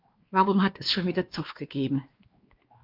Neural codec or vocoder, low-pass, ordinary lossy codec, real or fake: codec, 16 kHz, 2 kbps, X-Codec, WavLM features, trained on Multilingual LibriSpeech; 5.4 kHz; Opus, 24 kbps; fake